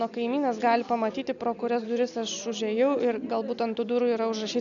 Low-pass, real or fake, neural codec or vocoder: 7.2 kHz; real; none